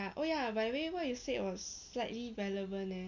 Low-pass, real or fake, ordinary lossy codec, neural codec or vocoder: 7.2 kHz; real; none; none